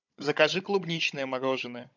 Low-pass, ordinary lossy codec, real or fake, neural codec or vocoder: 7.2 kHz; MP3, 64 kbps; fake; codec, 16 kHz, 16 kbps, FunCodec, trained on Chinese and English, 50 frames a second